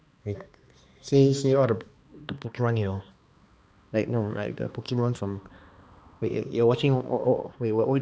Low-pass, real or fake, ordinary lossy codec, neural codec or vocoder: none; fake; none; codec, 16 kHz, 2 kbps, X-Codec, HuBERT features, trained on balanced general audio